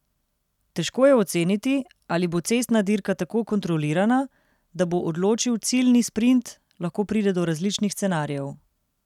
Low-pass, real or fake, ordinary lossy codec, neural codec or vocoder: 19.8 kHz; real; none; none